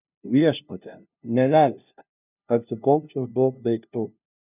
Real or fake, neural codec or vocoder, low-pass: fake; codec, 16 kHz, 0.5 kbps, FunCodec, trained on LibriTTS, 25 frames a second; 3.6 kHz